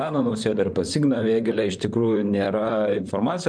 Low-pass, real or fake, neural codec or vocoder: 9.9 kHz; fake; vocoder, 44.1 kHz, 128 mel bands, Pupu-Vocoder